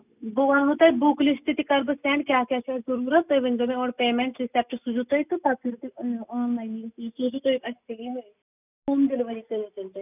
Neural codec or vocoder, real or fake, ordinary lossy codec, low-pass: none; real; none; 3.6 kHz